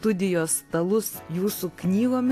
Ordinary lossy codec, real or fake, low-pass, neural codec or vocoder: AAC, 64 kbps; real; 14.4 kHz; none